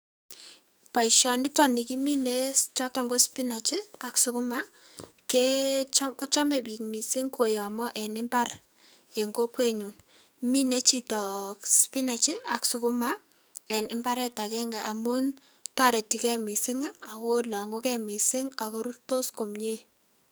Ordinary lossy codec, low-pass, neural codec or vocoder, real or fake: none; none; codec, 44.1 kHz, 2.6 kbps, SNAC; fake